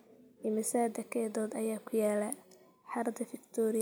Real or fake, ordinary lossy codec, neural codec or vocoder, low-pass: real; none; none; none